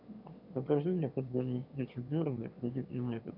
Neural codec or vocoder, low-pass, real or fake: autoencoder, 22.05 kHz, a latent of 192 numbers a frame, VITS, trained on one speaker; 5.4 kHz; fake